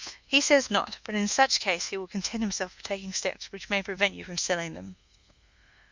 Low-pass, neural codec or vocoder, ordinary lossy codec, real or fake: 7.2 kHz; codec, 24 kHz, 1.2 kbps, DualCodec; Opus, 64 kbps; fake